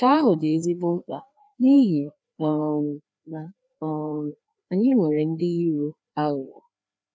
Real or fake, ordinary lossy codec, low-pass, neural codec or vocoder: fake; none; none; codec, 16 kHz, 2 kbps, FreqCodec, larger model